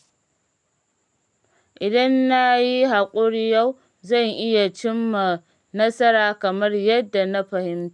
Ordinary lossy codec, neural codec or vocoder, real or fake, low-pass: none; none; real; 10.8 kHz